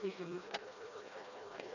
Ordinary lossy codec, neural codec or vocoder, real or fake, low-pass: none; codec, 16 kHz, 1 kbps, FreqCodec, smaller model; fake; 7.2 kHz